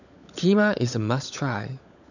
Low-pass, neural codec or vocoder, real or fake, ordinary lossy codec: 7.2 kHz; codec, 16 kHz, 16 kbps, FunCodec, trained on LibriTTS, 50 frames a second; fake; none